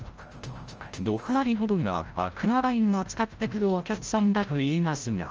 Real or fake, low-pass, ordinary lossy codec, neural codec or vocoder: fake; 7.2 kHz; Opus, 24 kbps; codec, 16 kHz, 0.5 kbps, FreqCodec, larger model